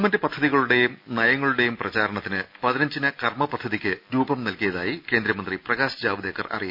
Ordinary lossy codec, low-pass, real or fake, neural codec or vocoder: none; 5.4 kHz; real; none